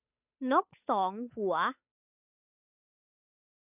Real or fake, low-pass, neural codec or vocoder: fake; 3.6 kHz; codec, 16 kHz, 8 kbps, FunCodec, trained on Chinese and English, 25 frames a second